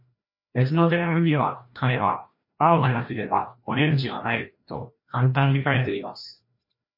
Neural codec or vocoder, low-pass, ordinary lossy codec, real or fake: codec, 16 kHz, 1 kbps, FreqCodec, larger model; 5.4 kHz; MP3, 32 kbps; fake